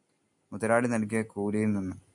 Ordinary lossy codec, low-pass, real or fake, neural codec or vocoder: MP3, 96 kbps; 10.8 kHz; real; none